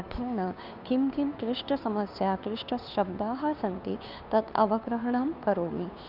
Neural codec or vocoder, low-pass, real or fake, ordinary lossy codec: codec, 16 kHz, 2 kbps, FunCodec, trained on Chinese and English, 25 frames a second; 5.4 kHz; fake; none